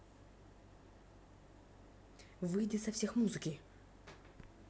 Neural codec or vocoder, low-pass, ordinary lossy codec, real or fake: none; none; none; real